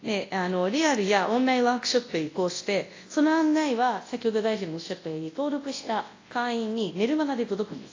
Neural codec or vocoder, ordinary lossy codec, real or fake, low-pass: codec, 24 kHz, 0.9 kbps, WavTokenizer, large speech release; AAC, 32 kbps; fake; 7.2 kHz